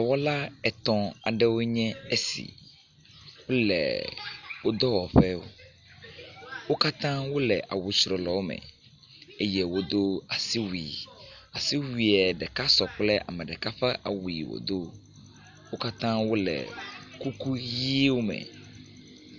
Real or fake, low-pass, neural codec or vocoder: real; 7.2 kHz; none